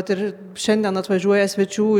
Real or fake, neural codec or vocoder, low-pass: real; none; 19.8 kHz